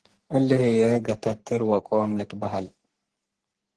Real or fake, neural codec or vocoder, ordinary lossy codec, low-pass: fake; codec, 44.1 kHz, 3.4 kbps, Pupu-Codec; Opus, 16 kbps; 10.8 kHz